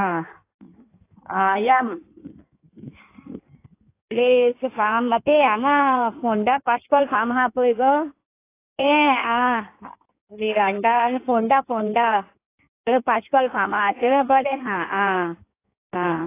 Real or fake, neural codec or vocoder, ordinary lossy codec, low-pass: fake; codec, 16 kHz in and 24 kHz out, 1.1 kbps, FireRedTTS-2 codec; AAC, 24 kbps; 3.6 kHz